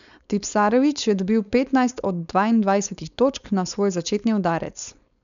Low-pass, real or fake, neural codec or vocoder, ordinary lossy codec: 7.2 kHz; fake; codec, 16 kHz, 4.8 kbps, FACodec; none